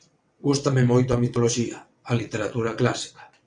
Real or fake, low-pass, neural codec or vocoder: fake; 9.9 kHz; vocoder, 22.05 kHz, 80 mel bands, WaveNeXt